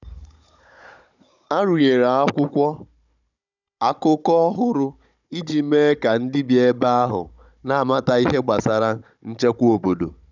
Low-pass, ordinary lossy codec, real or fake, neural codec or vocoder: 7.2 kHz; none; fake; codec, 16 kHz, 16 kbps, FunCodec, trained on Chinese and English, 50 frames a second